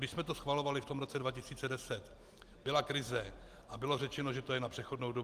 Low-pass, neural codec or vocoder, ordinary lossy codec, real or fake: 14.4 kHz; none; Opus, 24 kbps; real